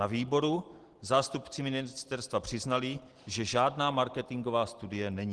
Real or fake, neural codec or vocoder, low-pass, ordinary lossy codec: real; none; 10.8 kHz; Opus, 16 kbps